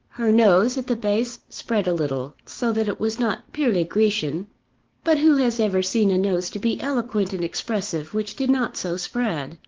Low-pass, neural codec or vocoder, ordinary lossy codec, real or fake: 7.2 kHz; codec, 16 kHz, 6 kbps, DAC; Opus, 16 kbps; fake